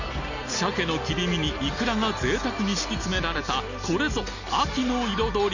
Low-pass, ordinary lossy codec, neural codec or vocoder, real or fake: 7.2 kHz; none; none; real